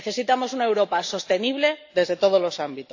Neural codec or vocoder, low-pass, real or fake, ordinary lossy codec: none; 7.2 kHz; real; AAC, 48 kbps